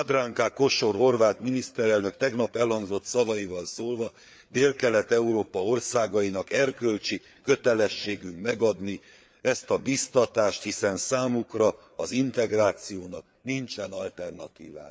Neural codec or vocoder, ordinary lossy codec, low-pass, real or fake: codec, 16 kHz, 4 kbps, FreqCodec, larger model; none; none; fake